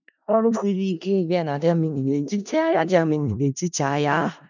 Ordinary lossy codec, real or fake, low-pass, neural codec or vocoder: none; fake; 7.2 kHz; codec, 16 kHz in and 24 kHz out, 0.4 kbps, LongCat-Audio-Codec, four codebook decoder